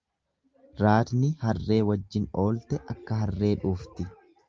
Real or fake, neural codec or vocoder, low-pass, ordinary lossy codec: real; none; 7.2 kHz; Opus, 24 kbps